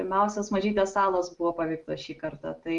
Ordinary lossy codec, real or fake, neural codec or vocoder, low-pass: Opus, 24 kbps; real; none; 10.8 kHz